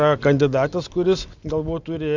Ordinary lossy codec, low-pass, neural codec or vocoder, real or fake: Opus, 64 kbps; 7.2 kHz; none; real